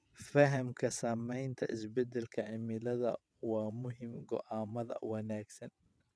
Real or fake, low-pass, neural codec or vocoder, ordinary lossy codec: fake; none; vocoder, 22.05 kHz, 80 mel bands, WaveNeXt; none